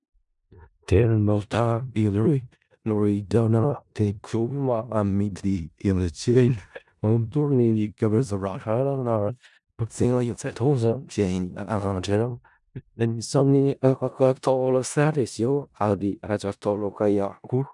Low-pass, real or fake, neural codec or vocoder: 10.8 kHz; fake; codec, 16 kHz in and 24 kHz out, 0.4 kbps, LongCat-Audio-Codec, four codebook decoder